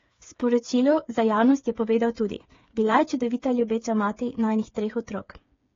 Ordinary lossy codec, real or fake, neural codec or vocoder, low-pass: AAC, 32 kbps; fake; codec, 16 kHz, 8 kbps, FreqCodec, smaller model; 7.2 kHz